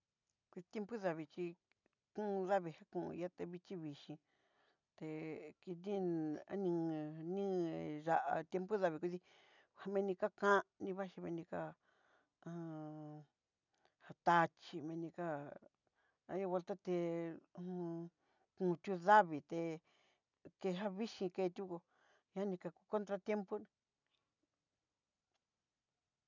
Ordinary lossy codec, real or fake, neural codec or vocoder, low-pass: none; real; none; 7.2 kHz